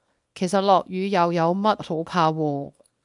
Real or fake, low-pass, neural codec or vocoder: fake; 10.8 kHz; codec, 24 kHz, 0.9 kbps, WavTokenizer, small release